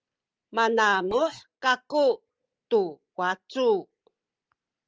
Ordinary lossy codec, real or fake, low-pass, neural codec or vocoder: Opus, 24 kbps; real; 7.2 kHz; none